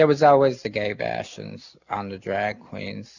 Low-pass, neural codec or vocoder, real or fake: 7.2 kHz; none; real